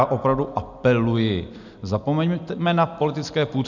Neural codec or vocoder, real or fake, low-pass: none; real; 7.2 kHz